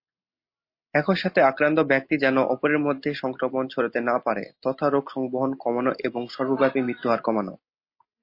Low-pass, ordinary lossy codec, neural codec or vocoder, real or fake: 5.4 kHz; MP3, 32 kbps; none; real